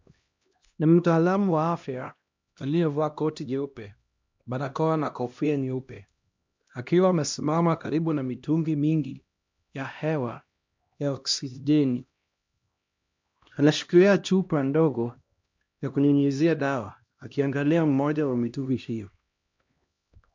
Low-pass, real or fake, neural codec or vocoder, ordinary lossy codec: 7.2 kHz; fake; codec, 16 kHz, 1 kbps, X-Codec, HuBERT features, trained on LibriSpeech; MP3, 64 kbps